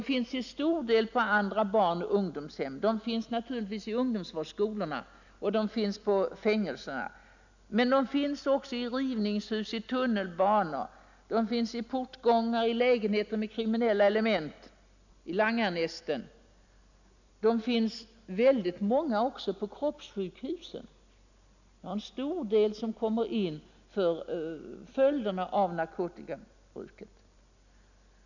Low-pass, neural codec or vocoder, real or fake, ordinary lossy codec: 7.2 kHz; none; real; none